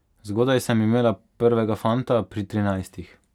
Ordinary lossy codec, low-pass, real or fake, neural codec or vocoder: none; 19.8 kHz; real; none